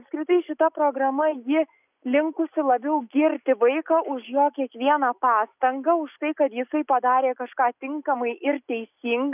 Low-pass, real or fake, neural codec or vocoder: 3.6 kHz; real; none